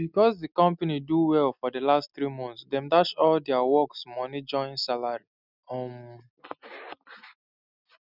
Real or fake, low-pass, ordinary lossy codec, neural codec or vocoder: real; 5.4 kHz; none; none